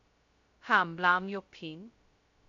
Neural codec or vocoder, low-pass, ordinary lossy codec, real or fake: codec, 16 kHz, 0.2 kbps, FocalCodec; 7.2 kHz; AAC, 64 kbps; fake